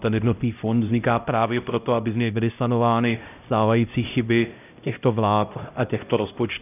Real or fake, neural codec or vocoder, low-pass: fake; codec, 16 kHz, 0.5 kbps, X-Codec, HuBERT features, trained on LibriSpeech; 3.6 kHz